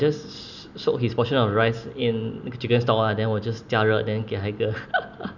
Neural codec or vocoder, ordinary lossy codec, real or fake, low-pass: none; none; real; 7.2 kHz